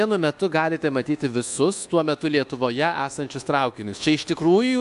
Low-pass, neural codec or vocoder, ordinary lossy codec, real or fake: 10.8 kHz; codec, 24 kHz, 1.2 kbps, DualCodec; AAC, 64 kbps; fake